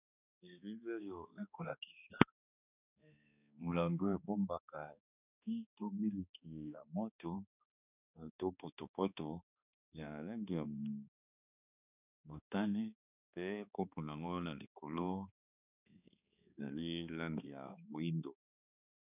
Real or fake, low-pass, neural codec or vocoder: fake; 3.6 kHz; codec, 16 kHz, 2 kbps, X-Codec, HuBERT features, trained on balanced general audio